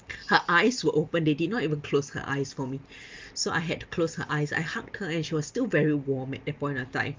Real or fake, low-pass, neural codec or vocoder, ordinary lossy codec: real; 7.2 kHz; none; Opus, 32 kbps